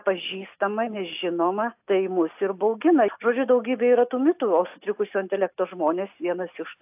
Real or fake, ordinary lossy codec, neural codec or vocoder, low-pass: real; AAC, 32 kbps; none; 3.6 kHz